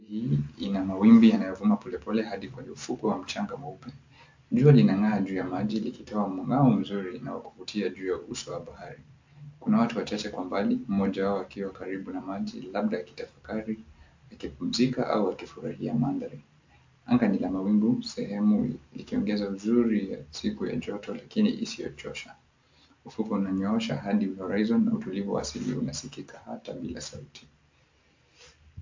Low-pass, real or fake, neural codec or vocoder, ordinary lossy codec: 7.2 kHz; real; none; MP3, 48 kbps